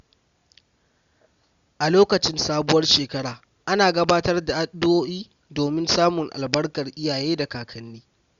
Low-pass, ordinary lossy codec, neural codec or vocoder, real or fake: 7.2 kHz; Opus, 64 kbps; none; real